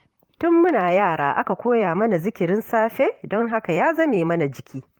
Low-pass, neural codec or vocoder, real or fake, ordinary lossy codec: 19.8 kHz; vocoder, 44.1 kHz, 128 mel bands, Pupu-Vocoder; fake; Opus, 32 kbps